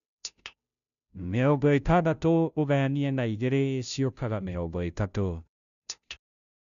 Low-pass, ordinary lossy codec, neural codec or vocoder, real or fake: 7.2 kHz; none; codec, 16 kHz, 0.5 kbps, FunCodec, trained on Chinese and English, 25 frames a second; fake